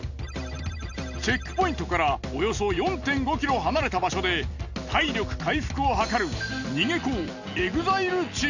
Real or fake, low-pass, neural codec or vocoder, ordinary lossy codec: real; 7.2 kHz; none; none